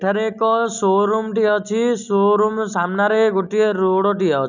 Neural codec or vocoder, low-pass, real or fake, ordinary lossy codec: none; 7.2 kHz; real; none